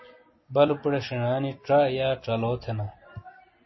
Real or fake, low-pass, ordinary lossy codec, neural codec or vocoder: real; 7.2 kHz; MP3, 24 kbps; none